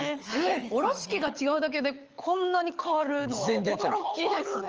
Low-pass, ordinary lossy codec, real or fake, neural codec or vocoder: 7.2 kHz; Opus, 24 kbps; fake; codec, 24 kHz, 6 kbps, HILCodec